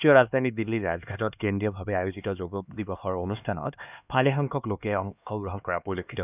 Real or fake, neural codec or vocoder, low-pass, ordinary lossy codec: fake; codec, 16 kHz, 2 kbps, X-Codec, HuBERT features, trained on LibriSpeech; 3.6 kHz; none